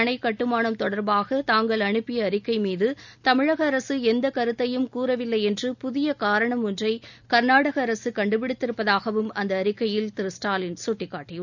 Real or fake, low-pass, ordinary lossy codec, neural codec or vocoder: real; 7.2 kHz; none; none